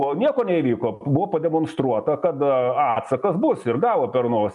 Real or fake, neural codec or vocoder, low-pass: real; none; 10.8 kHz